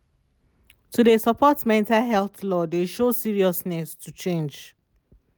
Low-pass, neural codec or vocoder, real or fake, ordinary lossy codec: none; none; real; none